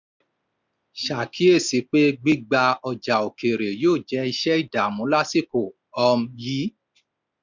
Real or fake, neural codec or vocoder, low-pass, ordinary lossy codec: real; none; 7.2 kHz; none